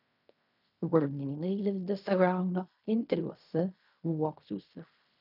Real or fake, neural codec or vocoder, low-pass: fake; codec, 16 kHz in and 24 kHz out, 0.4 kbps, LongCat-Audio-Codec, fine tuned four codebook decoder; 5.4 kHz